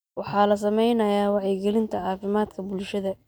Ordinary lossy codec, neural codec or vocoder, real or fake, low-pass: none; none; real; none